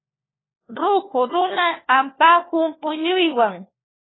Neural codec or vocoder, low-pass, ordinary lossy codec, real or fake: codec, 16 kHz, 1 kbps, FunCodec, trained on LibriTTS, 50 frames a second; 7.2 kHz; AAC, 16 kbps; fake